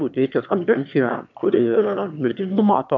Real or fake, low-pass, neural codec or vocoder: fake; 7.2 kHz; autoencoder, 22.05 kHz, a latent of 192 numbers a frame, VITS, trained on one speaker